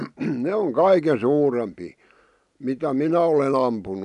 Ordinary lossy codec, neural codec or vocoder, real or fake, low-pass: none; none; real; 10.8 kHz